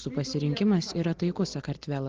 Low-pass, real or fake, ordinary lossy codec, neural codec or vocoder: 7.2 kHz; real; Opus, 16 kbps; none